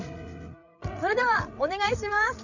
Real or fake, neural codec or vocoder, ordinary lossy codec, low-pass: fake; codec, 16 kHz, 8 kbps, FreqCodec, larger model; none; 7.2 kHz